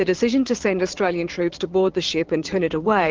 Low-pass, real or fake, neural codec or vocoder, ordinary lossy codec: 7.2 kHz; fake; vocoder, 22.05 kHz, 80 mel bands, Vocos; Opus, 16 kbps